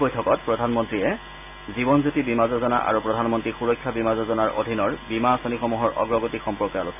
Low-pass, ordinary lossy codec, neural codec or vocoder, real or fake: 3.6 kHz; MP3, 24 kbps; none; real